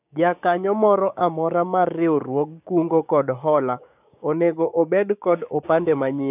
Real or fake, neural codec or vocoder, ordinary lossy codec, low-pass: fake; codec, 16 kHz, 6 kbps, DAC; none; 3.6 kHz